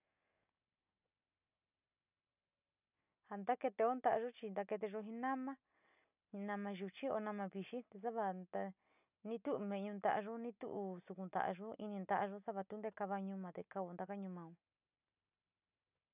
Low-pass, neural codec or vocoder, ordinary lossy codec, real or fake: 3.6 kHz; none; none; real